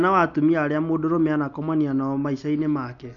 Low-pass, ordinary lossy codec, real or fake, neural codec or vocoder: 7.2 kHz; none; real; none